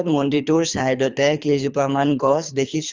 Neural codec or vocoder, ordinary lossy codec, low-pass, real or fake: codec, 24 kHz, 3 kbps, HILCodec; Opus, 32 kbps; 7.2 kHz; fake